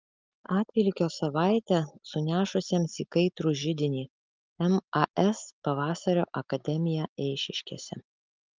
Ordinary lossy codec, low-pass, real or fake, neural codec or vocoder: Opus, 24 kbps; 7.2 kHz; real; none